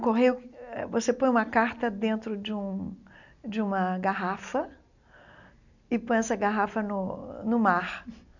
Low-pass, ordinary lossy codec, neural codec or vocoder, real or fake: 7.2 kHz; none; none; real